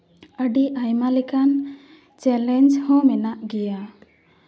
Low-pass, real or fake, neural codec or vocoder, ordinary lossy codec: none; real; none; none